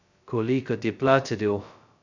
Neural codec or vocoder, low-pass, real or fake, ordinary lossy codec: codec, 16 kHz, 0.2 kbps, FocalCodec; 7.2 kHz; fake; none